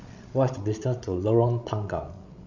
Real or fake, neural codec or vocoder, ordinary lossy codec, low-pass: fake; codec, 16 kHz, 16 kbps, FreqCodec, larger model; none; 7.2 kHz